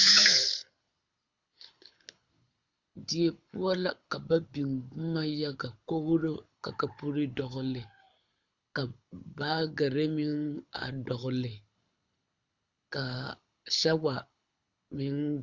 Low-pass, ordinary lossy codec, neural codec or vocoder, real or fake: 7.2 kHz; Opus, 64 kbps; codec, 24 kHz, 6 kbps, HILCodec; fake